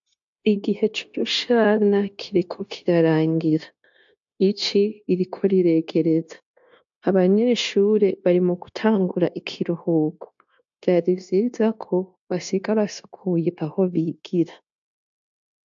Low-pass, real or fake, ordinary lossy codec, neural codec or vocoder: 7.2 kHz; fake; AAC, 64 kbps; codec, 16 kHz, 0.9 kbps, LongCat-Audio-Codec